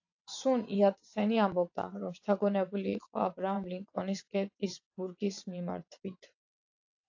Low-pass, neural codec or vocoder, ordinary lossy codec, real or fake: 7.2 kHz; vocoder, 22.05 kHz, 80 mel bands, WaveNeXt; AAC, 48 kbps; fake